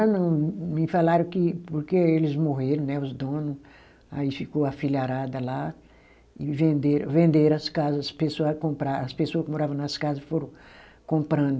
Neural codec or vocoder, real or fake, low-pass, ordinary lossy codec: none; real; none; none